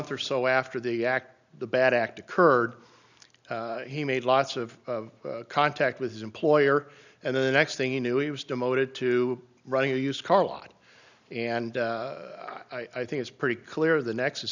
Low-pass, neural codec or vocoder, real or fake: 7.2 kHz; none; real